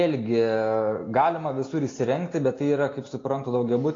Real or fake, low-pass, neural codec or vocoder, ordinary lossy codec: real; 7.2 kHz; none; AAC, 32 kbps